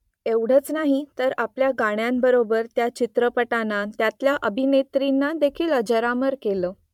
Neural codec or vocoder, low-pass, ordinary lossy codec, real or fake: vocoder, 44.1 kHz, 128 mel bands every 512 samples, BigVGAN v2; 19.8 kHz; MP3, 96 kbps; fake